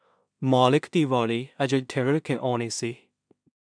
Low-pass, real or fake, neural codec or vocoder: 9.9 kHz; fake; codec, 16 kHz in and 24 kHz out, 0.4 kbps, LongCat-Audio-Codec, two codebook decoder